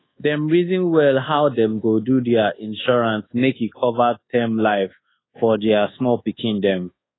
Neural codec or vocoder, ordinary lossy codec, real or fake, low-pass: codec, 24 kHz, 1.2 kbps, DualCodec; AAC, 16 kbps; fake; 7.2 kHz